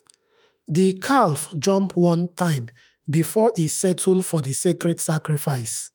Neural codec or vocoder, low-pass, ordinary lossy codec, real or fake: autoencoder, 48 kHz, 32 numbers a frame, DAC-VAE, trained on Japanese speech; none; none; fake